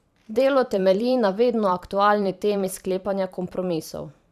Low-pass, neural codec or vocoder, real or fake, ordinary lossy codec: 14.4 kHz; vocoder, 44.1 kHz, 128 mel bands every 512 samples, BigVGAN v2; fake; Opus, 64 kbps